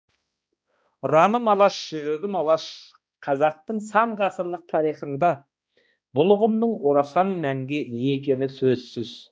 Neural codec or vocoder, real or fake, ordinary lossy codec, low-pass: codec, 16 kHz, 1 kbps, X-Codec, HuBERT features, trained on balanced general audio; fake; none; none